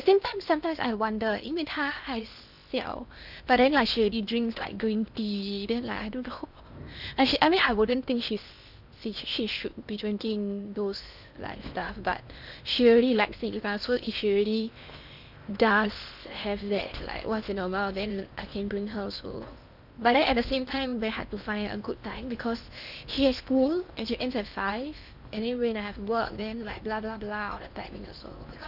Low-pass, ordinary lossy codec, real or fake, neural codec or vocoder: 5.4 kHz; none; fake; codec, 16 kHz in and 24 kHz out, 0.8 kbps, FocalCodec, streaming, 65536 codes